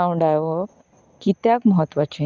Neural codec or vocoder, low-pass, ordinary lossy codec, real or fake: none; 7.2 kHz; Opus, 32 kbps; real